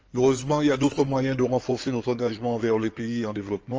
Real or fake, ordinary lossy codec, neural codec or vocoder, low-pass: fake; Opus, 24 kbps; codec, 16 kHz in and 24 kHz out, 2.2 kbps, FireRedTTS-2 codec; 7.2 kHz